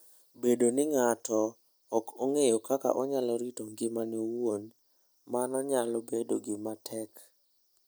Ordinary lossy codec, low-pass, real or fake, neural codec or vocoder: none; none; real; none